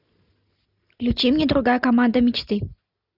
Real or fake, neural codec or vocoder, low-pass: real; none; 5.4 kHz